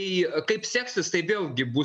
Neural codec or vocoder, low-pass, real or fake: none; 10.8 kHz; real